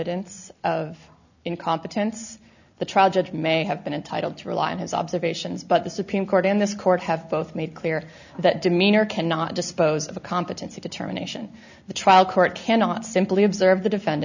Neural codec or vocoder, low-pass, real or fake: none; 7.2 kHz; real